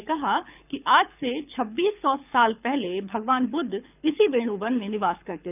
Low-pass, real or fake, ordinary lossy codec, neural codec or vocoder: 3.6 kHz; fake; none; codec, 24 kHz, 6 kbps, HILCodec